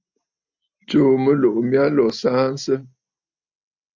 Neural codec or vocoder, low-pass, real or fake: none; 7.2 kHz; real